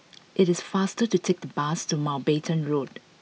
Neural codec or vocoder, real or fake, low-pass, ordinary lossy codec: none; real; none; none